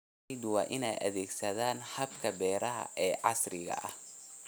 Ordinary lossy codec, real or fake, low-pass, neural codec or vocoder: none; real; none; none